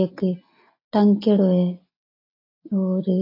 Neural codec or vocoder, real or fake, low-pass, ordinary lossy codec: none; real; 5.4 kHz; AAC, 24 kbps